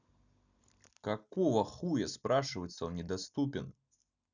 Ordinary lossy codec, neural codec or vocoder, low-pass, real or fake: none; none; 7.2 kHz; real